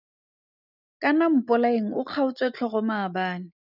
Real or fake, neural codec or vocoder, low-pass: real; none; 5.4 kHz